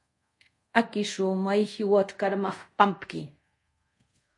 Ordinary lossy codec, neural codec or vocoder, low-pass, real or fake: MP3, 48 kbps; codec, 24 kHz, 0.5 kbps, DualCodec; 10.8 kHz; fake